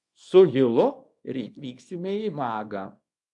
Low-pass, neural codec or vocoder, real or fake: 10.8 kHz; codec, 24 kHz, 0.9 kbps, WavTokenizer, small release; fake